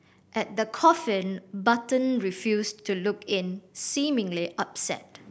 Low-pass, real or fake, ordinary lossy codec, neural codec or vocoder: none; real; none; none